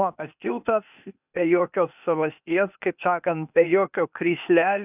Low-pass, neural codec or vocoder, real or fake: 3.6 kHz; codec, 16 kHz, 0.8 kbps, ZipCodec; fake